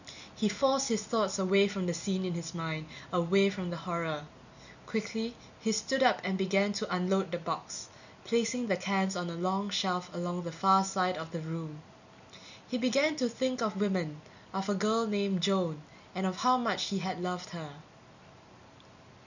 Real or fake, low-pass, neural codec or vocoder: real; 7.2 kHz; none